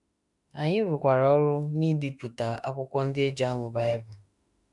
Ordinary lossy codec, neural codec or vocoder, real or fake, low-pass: none; autoencoder, 48 kHz, 32 numbers a frame, DAC-VAE, trained on Japanese speech; fake; 10.8 kHz